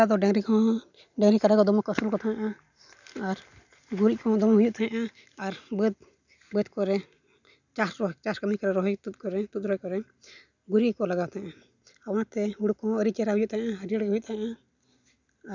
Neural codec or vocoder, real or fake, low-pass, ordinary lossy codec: none; real; 7.2 kHz; none